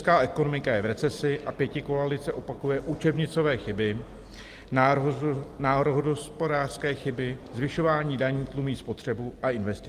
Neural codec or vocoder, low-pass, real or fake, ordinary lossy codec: none; 14.4 kHz; real; Opus, 24 kbps